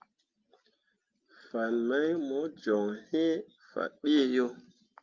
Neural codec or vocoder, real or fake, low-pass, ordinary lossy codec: none; real; 7.2 kHz; Opus, 24 kbps